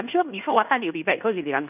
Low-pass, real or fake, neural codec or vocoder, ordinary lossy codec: 3.6 kHz; fake; codec, 16 kHz in and 24 kHz out, 0.9 kbps, LongCat-Audio-Codec, fine tuned four codebook decoder; none